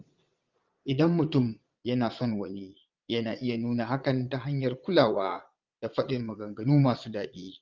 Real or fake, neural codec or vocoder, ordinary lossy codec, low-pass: fake; vocoder, 22.05 kHz, 80 mel bands, Vocos; Opus, 24 kbps; 7.2 kHz